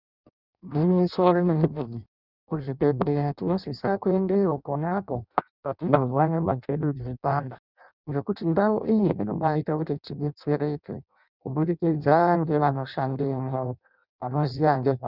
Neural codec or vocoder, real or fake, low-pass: codec, 16 kHz in and 24 kHz out, 0.6 kbps, FireRedTTS-2 codec; fake; 5.4 kHz